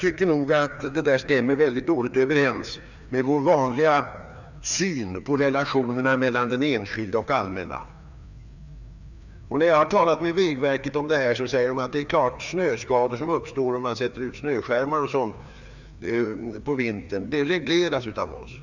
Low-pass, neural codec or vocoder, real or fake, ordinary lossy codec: 7.2 kHz; codec, 16 kHz, 2 kbps, FreqCodec, larger model; fake; none